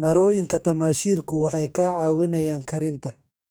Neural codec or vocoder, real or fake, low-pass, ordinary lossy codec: codec, 44.1 kHz, 2.6 kbps, DAC; fake; none; none